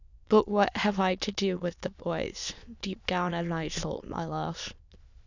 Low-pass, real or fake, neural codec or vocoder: 7.2 kHz; fake; autoencoder, 22.05 kHz, a latent of 192 numbers a frame, VITS, trained on many speakers